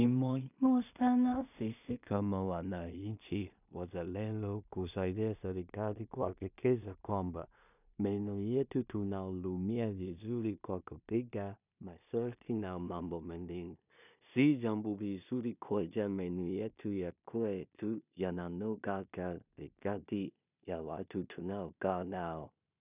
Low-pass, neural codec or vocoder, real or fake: 3.6 kHz; codec, 16 kHz in and 24 kHz out, 0.4 kbps, LongCat-Audio-Codec, two codebook decoder; fake